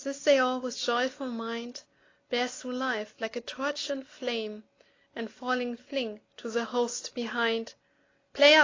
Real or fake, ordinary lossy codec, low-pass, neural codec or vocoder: real; AAC, 32 kbps; 7.2 kHz; none